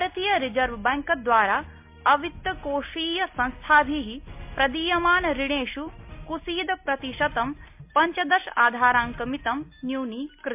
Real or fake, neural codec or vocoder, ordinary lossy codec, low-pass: real; none; MP3, 32 kbps; 3.6 kHz